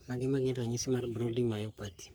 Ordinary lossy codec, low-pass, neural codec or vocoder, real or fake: none; none; codec, 44.1 kHz, 3.4 kbps, Pupu-Codec; fake